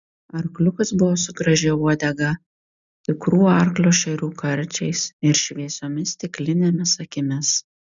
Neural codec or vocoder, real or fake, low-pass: none; real; 7.2 kHz